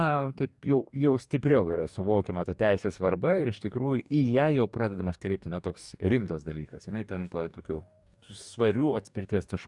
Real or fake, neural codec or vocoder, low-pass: fake; codec, 44.1 kHz, 2.6 kbps, DAC; 10.8 kHz